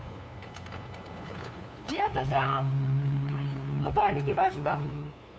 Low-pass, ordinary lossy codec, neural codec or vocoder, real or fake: none; none; codec, 16 kHz, 2 kbps, FunCodec, trained on LibriTTS, 25 frames a second; fake